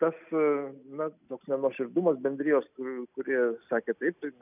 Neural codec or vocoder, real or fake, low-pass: none; real; 3.6 kHz